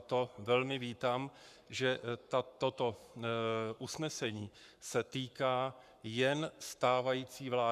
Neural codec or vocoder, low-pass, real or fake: codec, 44.1 kHz, 7.8 kbps, Pupu-Codec; 14.4 kHz; fake